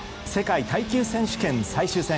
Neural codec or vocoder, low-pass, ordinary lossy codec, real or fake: none; none; none; real